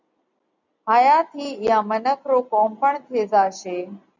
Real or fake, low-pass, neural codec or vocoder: real; 7.2 kHz; none